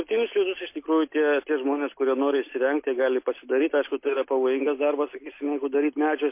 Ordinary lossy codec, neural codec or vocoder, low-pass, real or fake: MP3, 24 kbps; none; 3.6 kHz; real